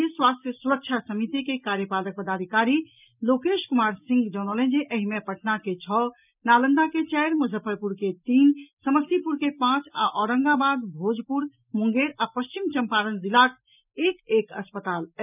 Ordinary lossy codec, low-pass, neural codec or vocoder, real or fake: none; 3.6 kHz; none; real